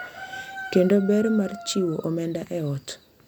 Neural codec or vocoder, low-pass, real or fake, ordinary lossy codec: none; 19.8 kHz; real; MP3, 96 kbps